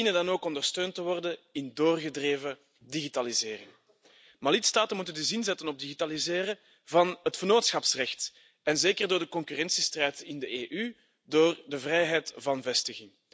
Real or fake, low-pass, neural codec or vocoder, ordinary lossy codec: real; none; none; none